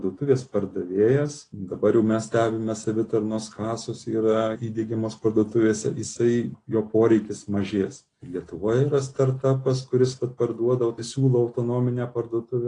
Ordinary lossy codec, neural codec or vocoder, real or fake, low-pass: AAC, 32 kbps; none; real; 9.9 kHz